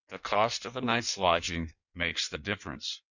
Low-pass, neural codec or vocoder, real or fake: 7.2 kHz; codec, 16 kHz in and 24 kHz out, 1.1 kbps, FireRedTTS-2 codec; fake